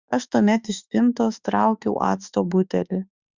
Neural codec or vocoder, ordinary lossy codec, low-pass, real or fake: autoencoder, 48 kHz, 32 numbers a frame, DAC-VAE, trained on Japanese speech; Opus, 64 kbps; 7.2 kHz; fake